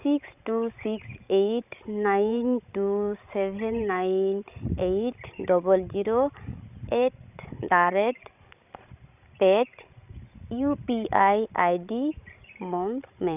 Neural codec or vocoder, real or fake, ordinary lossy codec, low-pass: codec, 16 kHz, 16 kbps, FreqCodec, larger model; fake; none; 3.6 kHz